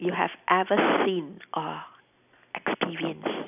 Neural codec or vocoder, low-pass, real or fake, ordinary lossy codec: none; 3.6 kHz; real; none